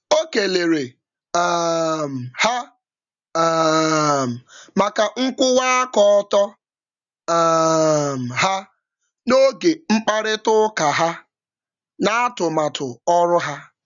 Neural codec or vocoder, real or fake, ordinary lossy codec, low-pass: none; real; none; 7.2 kHz